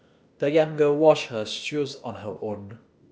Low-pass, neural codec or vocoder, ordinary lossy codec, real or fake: none; codec, 16 kHz, 0.8 kbps, ZipCodec; none; fake